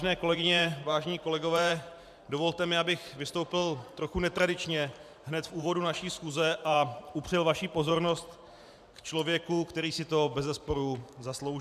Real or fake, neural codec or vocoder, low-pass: fake; vocoder, 44.1 kHz, 128 mel bands every 512 samples, BigVGAN v2; 14.4 kHz